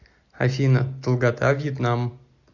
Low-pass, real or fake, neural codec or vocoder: 7.2 kHz; real; none